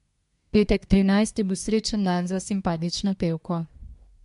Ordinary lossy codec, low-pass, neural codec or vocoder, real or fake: MP3, 64 kbps; 10.8 kHz; codec, 24 kHz, 1 kbps, SNAC; fake